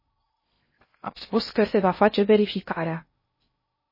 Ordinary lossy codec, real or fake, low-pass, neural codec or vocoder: MP3, 24 kbps; fake; 5.4 kHz; codec, 16 kHz in and 24 kHz out, 0.6 kbps, FocalCodec, streaming, 2048 codes